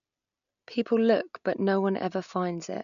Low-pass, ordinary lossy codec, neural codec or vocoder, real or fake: 7.2 kHz; none; none; real